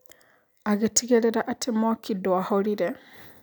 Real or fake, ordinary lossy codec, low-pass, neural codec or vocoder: real; none; none; none